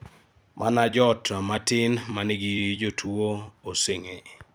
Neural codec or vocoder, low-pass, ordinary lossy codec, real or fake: vocoder, 44.1 kHz, 128 mel bands every 256 samples, BigVGAN v2; none; none; fake